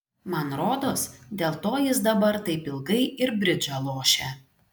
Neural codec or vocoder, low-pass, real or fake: none; 19.8 kHz; real